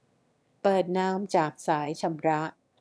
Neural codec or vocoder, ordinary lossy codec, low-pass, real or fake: autoencoder, 22.05 kHz, a latent of 192 numbers a frame, VITS, trained on one speaker; none; none; fake